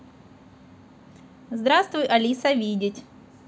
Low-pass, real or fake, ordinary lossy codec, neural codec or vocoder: none; real; none; none